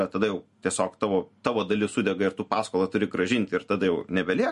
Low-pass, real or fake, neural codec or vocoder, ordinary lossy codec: 14.4 kHz; real; none; MP3, 48 kbps